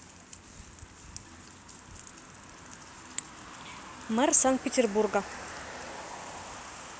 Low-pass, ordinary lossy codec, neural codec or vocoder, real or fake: none; none; none; real